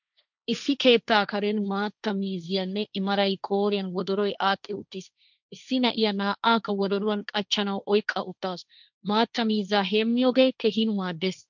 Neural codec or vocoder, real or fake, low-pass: codec, 16 kHz, 1.1 kbps, Voila-Tokenizer; fake; 7.2 kHz